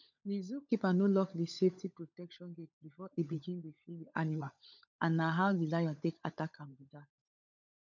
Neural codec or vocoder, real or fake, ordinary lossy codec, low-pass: codec, 16 kHz, 16 kbps, FunCodec, trained on LibriTTS, 50 frames a second; fake; none; 7.2 kHz